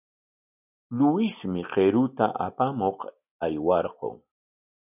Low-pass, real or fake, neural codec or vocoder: 3.6 kHz; real; none